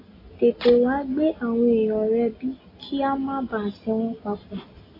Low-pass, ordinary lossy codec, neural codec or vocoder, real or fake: 5.4 kHz; AAC, 24 kbps; none; real